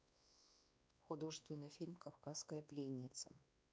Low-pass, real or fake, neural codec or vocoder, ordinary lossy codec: none; fake; codec, 16 kHz, 2 kbps, X-Codec, WavLM features, trained on Multilingual LibriSpeech; none